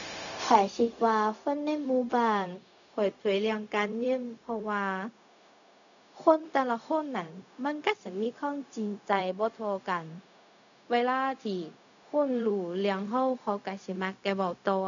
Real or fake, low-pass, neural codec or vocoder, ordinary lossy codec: fake; 7.2 kHz; codec, 16 kHz, 0.4 kbps, LongCat-Audio-Codec; none